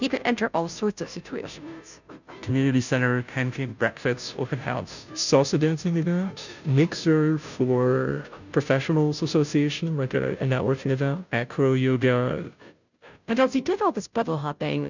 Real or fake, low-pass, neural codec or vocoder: fake; 7.2 kHz; codec, 16 kHz, 0.5 kbps, FunCodec, trained on Chinese and English, 25 frames a second